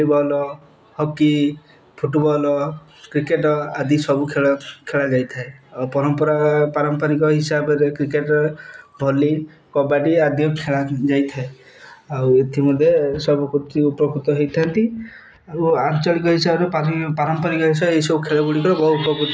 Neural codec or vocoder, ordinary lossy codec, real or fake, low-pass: none; none; real; none